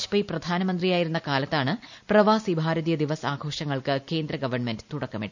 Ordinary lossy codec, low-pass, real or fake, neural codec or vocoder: none; 7.2 kHz; real; none